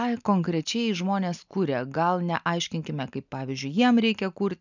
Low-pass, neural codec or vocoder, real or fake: 7.2 kHz; none; real